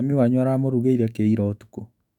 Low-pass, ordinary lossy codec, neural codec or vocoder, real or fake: 19.8 kHz; none; autoencoder, 48 kHz, 128 numbers a frame, DAC-VAE, trained on Japanese speech; fake